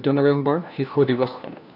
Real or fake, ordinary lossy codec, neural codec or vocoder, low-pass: fake; none; codec, 16 kHz, 1 kbps, FunCodec, trained on LibriTTS, 50 frames a second; 5.4 kHz